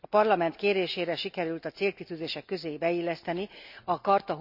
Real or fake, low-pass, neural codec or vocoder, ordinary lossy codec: real; 5.4 kHz; none; none